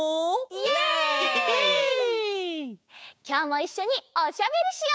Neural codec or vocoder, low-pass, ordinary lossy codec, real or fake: codec, 16 kHz, 6 kbps, DAC; none; none; fake